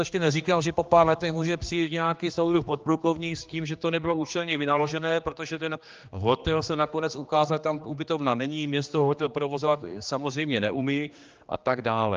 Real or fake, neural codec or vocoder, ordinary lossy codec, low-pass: fake; codec, 16 kHz, 2 kbps, X-Codec, HuBERT features, trained on general audio; Opus, 24 kbps; 7.2 kHz